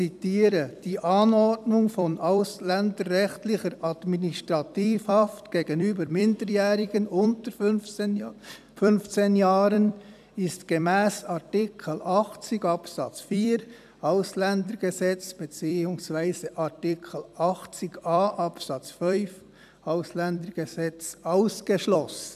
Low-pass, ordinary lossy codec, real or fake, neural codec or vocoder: 14.4 kHz; none; fake; vocoder, 44.1 kHz, 128 mel bands every 256 samples, BigVGAN v2